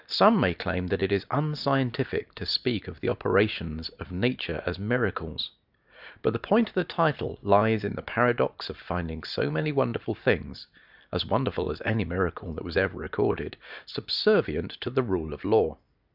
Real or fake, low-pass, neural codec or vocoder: real; 5.4 kHz; none